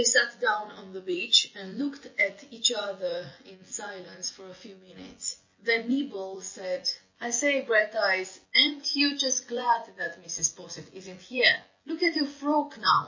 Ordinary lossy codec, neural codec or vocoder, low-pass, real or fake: MP3, 32 kbps; vocoder, 24 kHz, 100 mel bands, Vocos; 7.2 kHz; fake